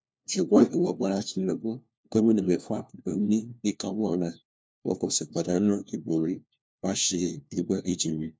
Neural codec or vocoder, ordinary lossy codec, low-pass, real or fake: codec, 16 kHz, 1 kbps, FunCodec, trained on LibriTTS, 50 frames a second; none; none; fake